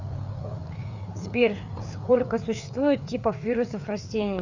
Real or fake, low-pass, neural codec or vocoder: fake; 7.2 kHz; codec, 16 kHz, 4 kbps, FreqCodec, larger model